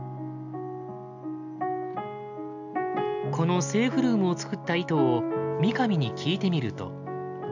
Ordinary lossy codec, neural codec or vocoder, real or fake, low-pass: none; none; real; 7.2 kHz